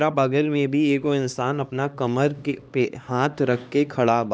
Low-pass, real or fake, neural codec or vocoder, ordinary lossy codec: none; fake; codec, 16 kHz, 2 kbps, X-Codec, HuBERT features, trained on LibriSpeech; none